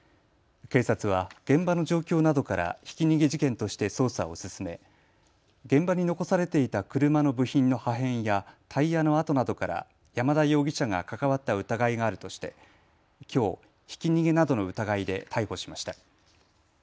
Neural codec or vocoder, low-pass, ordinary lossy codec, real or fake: none; none; none; real